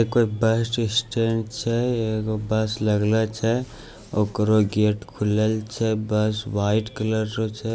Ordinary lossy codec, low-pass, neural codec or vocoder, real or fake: none; none; none; real